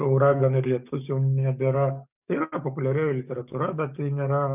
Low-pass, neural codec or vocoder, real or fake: 3.6 kHz; codec, 44.1 kHz, 7.8 kbps, DAC; fake